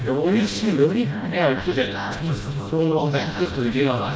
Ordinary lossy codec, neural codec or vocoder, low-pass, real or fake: none; codec, 16 kHz, 0.5 kbps, FreqCodec, smaller model; none; fake